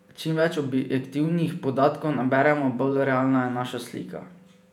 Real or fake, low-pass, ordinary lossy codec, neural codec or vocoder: fake; 19.8 kHz; none; vocoder, 48 kHz, 128 mel bands, Vocos